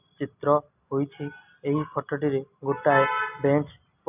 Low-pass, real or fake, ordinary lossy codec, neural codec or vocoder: 3.6 kHz; real; none; none